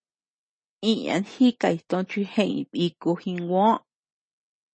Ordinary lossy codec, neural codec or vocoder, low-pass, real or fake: MP3, 32 kbps; none; 9.9 kHz; real